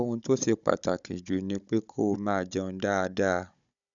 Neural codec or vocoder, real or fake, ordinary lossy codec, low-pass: codec, 16 kHz, 16 kbps, FunCodec, trained on Chinese and English, 50 frames a second; fake; none; 7.2 kHz